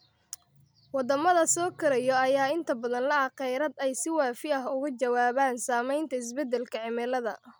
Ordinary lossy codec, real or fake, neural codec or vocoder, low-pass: none; real; none; none